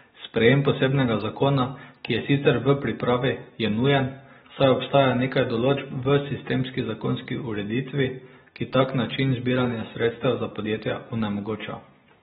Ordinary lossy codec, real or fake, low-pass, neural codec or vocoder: AAC, 16 kbps; real; 19.8 kHz; none